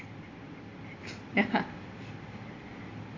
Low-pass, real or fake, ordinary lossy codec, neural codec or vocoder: 7.2 kHz; real; AAC, 32 kbps; none